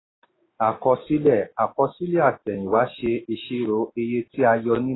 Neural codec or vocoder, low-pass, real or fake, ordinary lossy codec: none; 7.2 kHz; real; AAC, 16 kbps